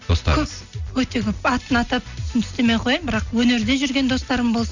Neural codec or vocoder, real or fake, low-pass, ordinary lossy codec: none; real; 7.2 kHz; none